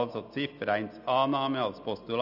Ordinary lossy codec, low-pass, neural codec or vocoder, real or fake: none; 5.4 kHz; none; real